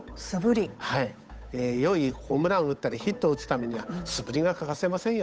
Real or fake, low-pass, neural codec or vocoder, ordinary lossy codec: fake; none; codec, 16 kHz, 8 kbps, FunCodec, trained on Chinese and English, 25 frames a second; none